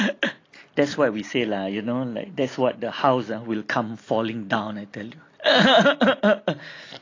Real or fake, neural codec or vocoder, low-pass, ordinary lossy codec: real; none; 7.2 kHz; AAC, 32 kbps